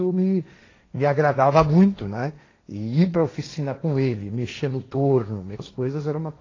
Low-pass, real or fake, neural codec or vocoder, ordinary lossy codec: 7.2 kHz; fake; codec, 16 kHz, 1.1 kbps, Voila-Tokenizer; AAC, 32 kbps